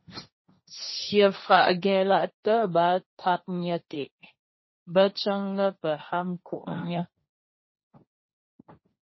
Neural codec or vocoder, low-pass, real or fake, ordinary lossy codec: codec, 16 kHz, 1.1 kbps, Voila-Tokenizer; 7.2 kHz; fake; MP3, 24 kbps